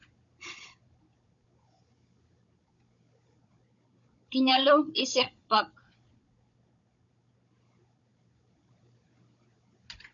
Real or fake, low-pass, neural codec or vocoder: fake; 7.2 kHz; codec, 16 kHz, 16 kbps, FunCodec, trained on Chinese and English, 50 frames a second